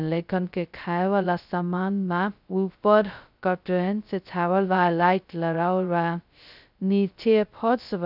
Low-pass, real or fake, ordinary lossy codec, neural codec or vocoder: 5.4 kHz; fake; none; codec, 16 kHz, 0.2 kbps, FocalCodec